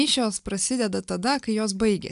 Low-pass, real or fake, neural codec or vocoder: 10.8 kHz; real; none